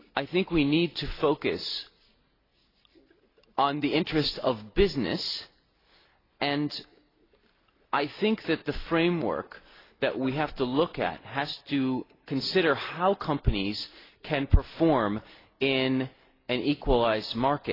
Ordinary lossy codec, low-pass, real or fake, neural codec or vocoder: AAC, 24 kbps; 5.4 kHz; real; none